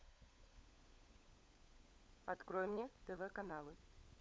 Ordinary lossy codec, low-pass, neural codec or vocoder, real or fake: none; none; codec, 16 kHz, 16 kbps, FunCodec, trained on LibriTTS, 50 frames a second; fake